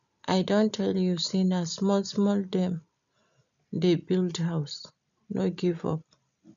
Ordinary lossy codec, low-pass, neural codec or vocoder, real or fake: none; 7.2 kHz; none; real